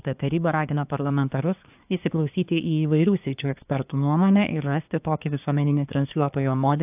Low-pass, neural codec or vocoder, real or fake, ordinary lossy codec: 3.6 kHz; codec, 24 kHz, 1 kbps, SNAC; fake; AAC, 32 kbps